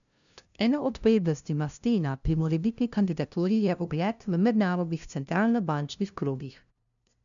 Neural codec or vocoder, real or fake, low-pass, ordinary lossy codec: codec, 16 kHz, 0.5 kbps, FunCodec, trained on LibriTTS, 25 frames a second; fake; 7.2 kHz; none